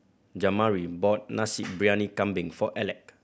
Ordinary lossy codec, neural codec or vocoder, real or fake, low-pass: none; none; real; none